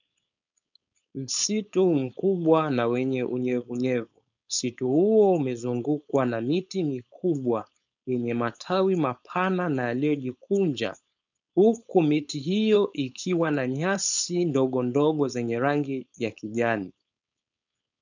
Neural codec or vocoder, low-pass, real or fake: codec, 16 kHz, 4.8 kbps, FACodec; 7.2 kHz; fake